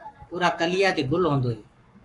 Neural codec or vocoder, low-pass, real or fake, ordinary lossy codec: codec, 44.1 kHz, 7.8 kbps, Pupu-Codec; 10.8 kHz; fake; AAC, 64 kbps